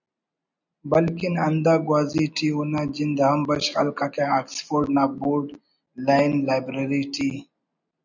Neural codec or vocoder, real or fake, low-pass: none; real; 7.2 kHz